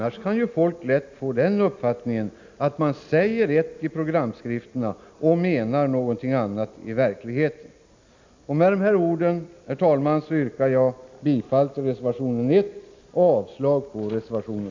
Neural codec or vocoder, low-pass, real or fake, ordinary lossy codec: none; 7.2 kHz; real; MP3, 64 kbps